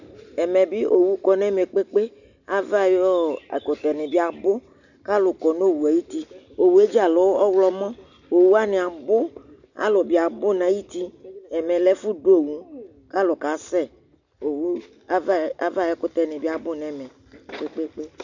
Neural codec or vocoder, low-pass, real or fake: none; 7.2 kHz; real